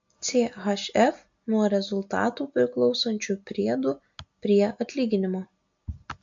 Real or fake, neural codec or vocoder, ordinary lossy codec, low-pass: real; none; MP3, 48 kbps; 7.2 kHz